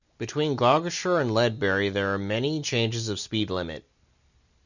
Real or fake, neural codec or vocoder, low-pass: real; none; 7.2 kHz